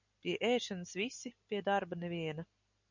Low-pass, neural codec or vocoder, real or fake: 7.2 kHz; none; real